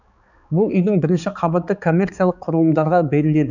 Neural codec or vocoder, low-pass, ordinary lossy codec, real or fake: codec, 16 kHz, 4 kbps, X-Codec, HuBERT features, trained on balanced general audio; 7.2 kHz; none; fake